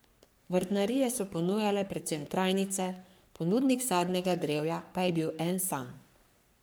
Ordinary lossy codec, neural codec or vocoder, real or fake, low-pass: none; codec, 44.1 kHz, 3.4 kbps, Pupu-Codec; fake; none